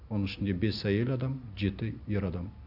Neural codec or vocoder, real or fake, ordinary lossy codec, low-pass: none; real; none; 5.4 kHz